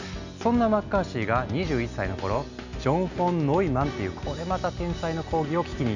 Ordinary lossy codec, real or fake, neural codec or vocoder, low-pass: none; real; none; 7.2 kHz